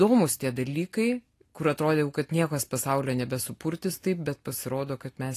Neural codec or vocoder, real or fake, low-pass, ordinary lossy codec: none; real; 14.4 kHz; AAC, 48 kbps